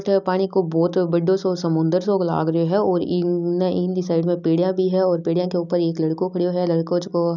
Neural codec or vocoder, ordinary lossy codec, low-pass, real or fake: none; none; 7.2 kHz; real